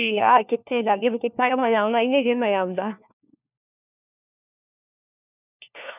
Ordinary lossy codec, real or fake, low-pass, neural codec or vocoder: none; fake; 3.6 kHz; codec, 16 kHz, 1 kbps, FunCodec, trained on LibriTTS, 50 frames a second